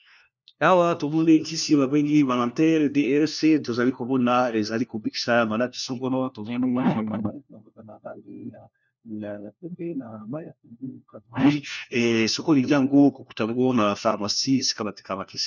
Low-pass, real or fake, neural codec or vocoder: 7.2 kHz; fake; codec, 16 kHz, 1 kbps, FunCodec, trained on LibriTTS, 50 frames a second